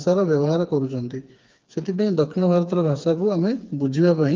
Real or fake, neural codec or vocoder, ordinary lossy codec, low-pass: fake; codec, 16 kHz, 4 kbps, FreqCodec, smaller model; Opus, 32 kbps; 7.2 kHz